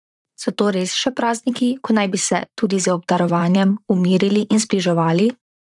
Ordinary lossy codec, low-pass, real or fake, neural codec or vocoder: none; 10.8 kHz; fake; vocoder, 44.1 kHz, 128 mel bands, Pupu-Vocoder